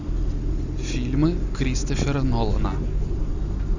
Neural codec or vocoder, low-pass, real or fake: none; 7.2 kHz; real